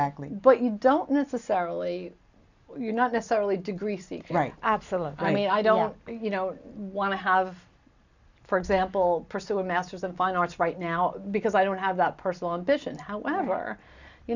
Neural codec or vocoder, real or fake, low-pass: none; real; 7.2 kHz